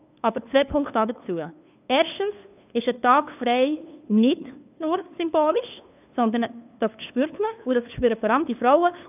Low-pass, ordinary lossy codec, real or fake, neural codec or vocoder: 3.6 kHz; none; fake; codec, 16 kHz, 2 kbps, FunCodec, trained on LibriTTS, 25 frames a second